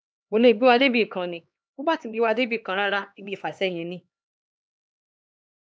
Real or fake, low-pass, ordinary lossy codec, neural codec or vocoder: fake; none; none; codec, 16 kHz, 2 kbps, X-Codec, HuBERT features, trained on LibriSpeech